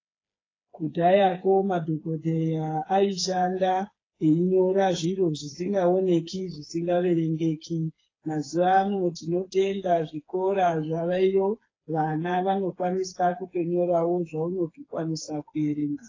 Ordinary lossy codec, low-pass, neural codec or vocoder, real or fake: AAC, 32 kbps; 7.2 kHz; codec, 16 kHz, 4 kbps, FreqCodec, smaller model; fake